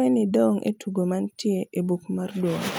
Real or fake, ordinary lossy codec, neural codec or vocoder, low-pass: real; none; none; none